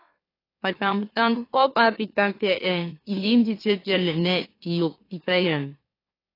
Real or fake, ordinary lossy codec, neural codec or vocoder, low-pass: fake; AAC, 24 kbps; autoencoder, 44.1 kHz, a latent of 192 numbers a frame, MeloTTS; 5.4 kHz